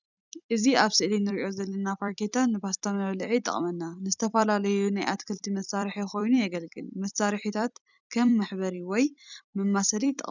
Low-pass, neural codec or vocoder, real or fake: 7.2 kHz; vocoder, 44.1 kHz, 128 mel bands every 256 samples, BigVGAN v2; fake